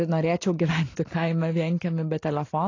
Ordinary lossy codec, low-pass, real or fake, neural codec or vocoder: AAC, 32 kbps; 7.2 kHz; real; none